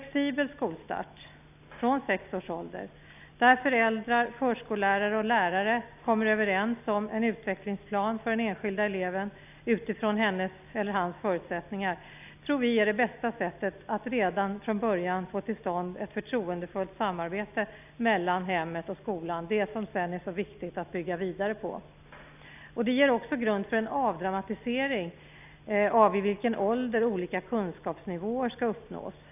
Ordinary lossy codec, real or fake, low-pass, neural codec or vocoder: none; real; 3.6 kHz; none